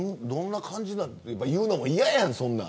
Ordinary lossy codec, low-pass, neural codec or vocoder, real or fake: none; none; none; real